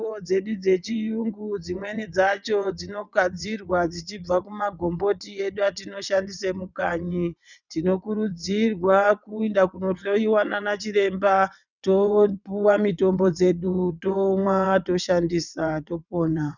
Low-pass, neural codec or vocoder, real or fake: 7.2 kHz; vocoder, 22.05 kHz, 80 mel bands, WaveNeXt; fake